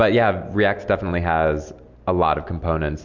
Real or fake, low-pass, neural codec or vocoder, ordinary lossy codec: real; 7.2 kHz; none; MP3, 64 kbps